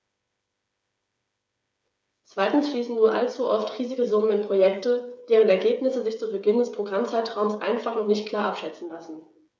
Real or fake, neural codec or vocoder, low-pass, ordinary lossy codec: fake; codec, 16 kHz, 8 kbps, FreqCodec, smaller model; none; none